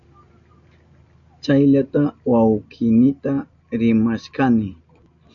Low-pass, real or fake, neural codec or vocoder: 7.2 kHz; real; none